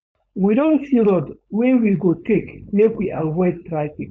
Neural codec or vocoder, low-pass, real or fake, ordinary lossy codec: codec, 16 kHz, 4.8 kbps, FACodec; none; fake; none